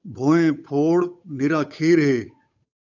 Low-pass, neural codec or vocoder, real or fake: 7.2 kHz; codec, 16 kHz, 8 kbps, FunCodec, trained on Chinese and English, 25 frames a second; fake